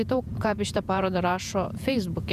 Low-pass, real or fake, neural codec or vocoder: 14.4 kHz; fake; vocoder, 48 kHz, 128 mel bands, Vocos